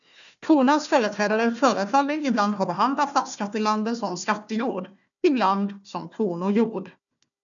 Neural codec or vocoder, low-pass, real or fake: codec, 16 kHz, 1 kbps, FunCodec, trained on Chinese and English, 50 frames a second; 7.2 kHz; fake